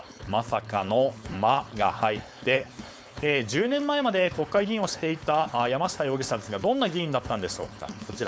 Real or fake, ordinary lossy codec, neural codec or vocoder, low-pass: fake; none; codec, 16 kHz, 4.8 kbps, FACodec; none